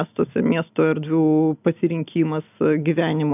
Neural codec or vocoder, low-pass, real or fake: none; 3.6 kHz; real